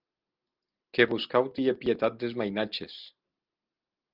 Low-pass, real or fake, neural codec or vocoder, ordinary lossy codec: 5.4 kHz; real; none; Opus, 24 kbps